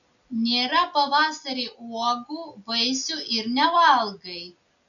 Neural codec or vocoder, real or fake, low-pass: none; real; 7.2 kHz